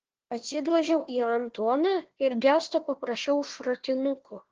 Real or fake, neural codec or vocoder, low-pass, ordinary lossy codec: fake; codec, 16 kHz, 1 kbps, FunCodec, trained on Chinese and English, 50 frames a second; 7.2 kHz; Opus, 16 kbps